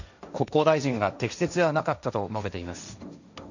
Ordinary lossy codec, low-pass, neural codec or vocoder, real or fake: none; 7.2 kHz; codec, 16 kHz, 1.1 kbps, Voila-Tokenizer; fake